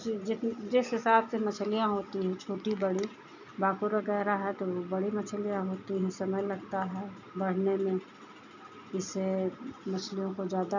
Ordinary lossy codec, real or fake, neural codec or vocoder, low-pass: none; real; none; 7.2 kHz